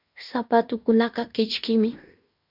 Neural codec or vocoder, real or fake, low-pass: codec, 16 kHz in and 24 kHz out, 0.9 kbps, LongCat-Audio-Codec, fine tuned four codebook decoder; fake; 5.4 kHz